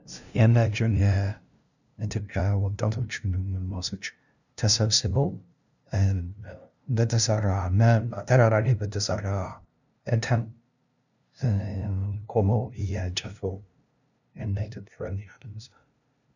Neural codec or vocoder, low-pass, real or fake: codec, 16 kHz, 0.5 kbps, FunCodec, trained on LibriTTS, 25 frames a second; 7.2 kHz; fake